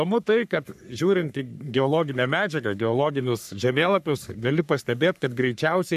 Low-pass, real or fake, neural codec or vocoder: 14.4 kHz; fake; codec, 44.1 kHz, 3.4 kbps, Pupu-Codec